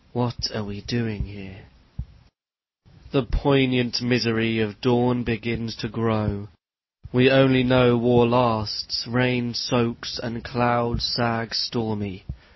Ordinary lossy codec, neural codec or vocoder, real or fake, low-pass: MP3, 24 kbps; none; real; 7.2 kHz